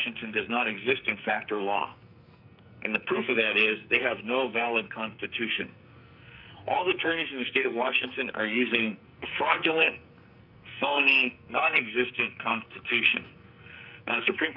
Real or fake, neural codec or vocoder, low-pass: fake; codec, 44.1 kHz, 2.6 kbps, SNAC; 5.4 kHz